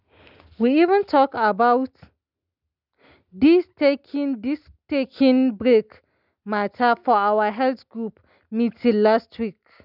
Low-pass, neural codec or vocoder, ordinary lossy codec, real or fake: 5.4 kHz; none; none; real